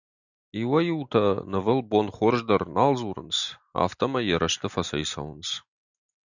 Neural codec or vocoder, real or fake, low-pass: none; real; 7.2 kHz